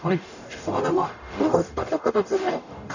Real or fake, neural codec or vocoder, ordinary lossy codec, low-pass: fake; codec, 44.1 kHz, 0.9 kbps, DAC; none; 7.2 kHz